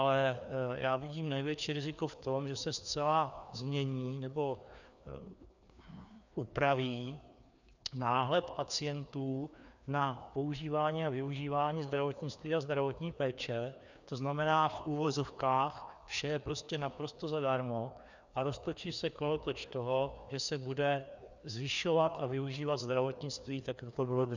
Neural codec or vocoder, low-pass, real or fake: codec, 16 kHz, 2 kbps, FreqCodec, larger model; 7.2 kHz; fake